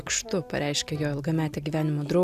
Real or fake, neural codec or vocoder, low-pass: real; none; 14.4 kHz